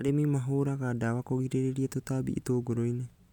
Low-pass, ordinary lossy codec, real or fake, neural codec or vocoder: 19.8 kHz; none; real; none